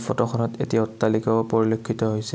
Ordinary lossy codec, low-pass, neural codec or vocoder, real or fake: none; none; none; real